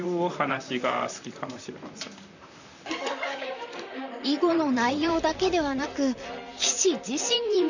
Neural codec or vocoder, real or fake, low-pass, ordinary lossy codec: vocoder, 44.1 kHz, 128 mel bands, Pupu-Vocoder; fake; 7.2 kHz; none